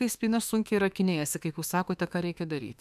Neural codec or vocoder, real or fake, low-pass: autoencoder, 48 kHz, 32 numbers a frame, DAC-VAE, trained on Japanese speech; fake; 14.4 kHz